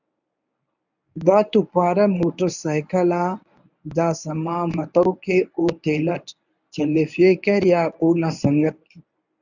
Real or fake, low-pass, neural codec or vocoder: fake; 7.2 kHz; codec, 24 kHz, 0.9 kbps, WavTokenizer, medium speech release version 1